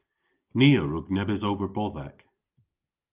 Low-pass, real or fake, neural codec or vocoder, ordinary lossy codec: 3.6 kHz; real; none; Opus, 32 kbps